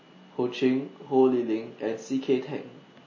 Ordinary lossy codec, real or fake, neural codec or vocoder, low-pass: MP3, 32 kbps; real; none; 7.2 kHz